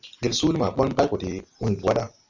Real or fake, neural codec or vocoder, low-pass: real; none; 7.2 kHz